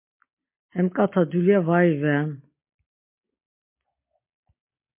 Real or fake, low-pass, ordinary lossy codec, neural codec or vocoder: real; 3.6 kHz; MP3, 24 kbps; none